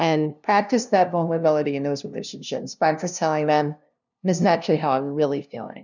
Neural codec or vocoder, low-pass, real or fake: codec, 16 kHz, 0.5 kbps, FunCodec, trained on LibriTTS, 25 frames a second; 7.2 kHz; fake